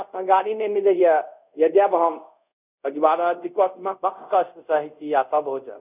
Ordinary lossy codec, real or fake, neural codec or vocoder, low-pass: none; fake; codec, 24 kHz, 0.5 kbps, DualCodec; 3.6 kHz